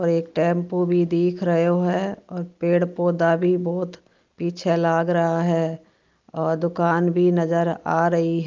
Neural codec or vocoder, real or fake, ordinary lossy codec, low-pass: none; real; Opus, 32 kbps; 7.2 kHz